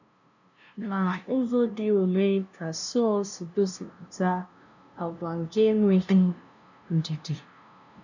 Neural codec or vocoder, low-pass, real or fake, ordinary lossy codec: codec, 16 kHz, 0.5 kbps, FunCodec, trained on LibriTTS, 25 frames a second; 7.2 kHz; fake; none